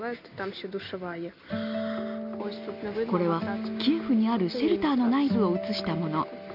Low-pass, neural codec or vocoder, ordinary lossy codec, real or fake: 5.4 kHz; none; none; real